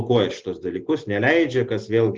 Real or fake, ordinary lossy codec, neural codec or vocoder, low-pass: real; Opus, 16 kbps; none; 7.2 kHz